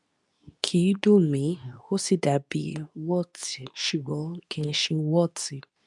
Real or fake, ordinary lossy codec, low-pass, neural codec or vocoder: fake; none; 10.8 kHz; codec, 24 kHz, 0.9 kbps, WavTokenizer, medium speech release version 2